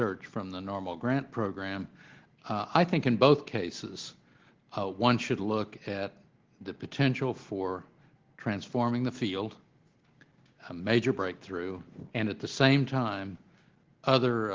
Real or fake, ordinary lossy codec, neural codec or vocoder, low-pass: real; Opus, 16 kbps; none; 7.2 kHz